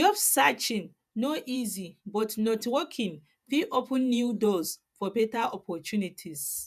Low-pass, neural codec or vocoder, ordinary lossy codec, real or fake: 14.4 kHz; vocoder, 48 kHz, 128 mel bands, Vocos; none; fake